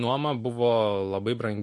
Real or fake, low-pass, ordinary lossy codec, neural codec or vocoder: real; 10.8 kHz; MP3, 48 kbps; none